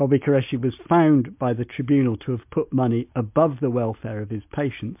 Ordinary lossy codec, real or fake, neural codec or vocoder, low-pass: MP3, 32 kbps; real; none; 3.6 kHz